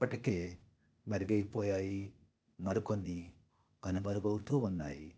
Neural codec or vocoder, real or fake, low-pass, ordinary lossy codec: codec, 16 kHz, 0.8 kbps, ZipCodec; fake; none; none